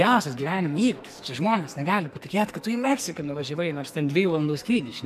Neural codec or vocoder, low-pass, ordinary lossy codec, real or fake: codec, 44.1 kHz, 2.6 kbps, SNAC; 14.4 kHz; MP3, 96 kbps; fake